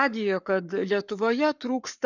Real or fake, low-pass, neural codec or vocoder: fake; 7.2 kHz; codec, 44.1 kHz, 7.8 kbps, Pupu-Codec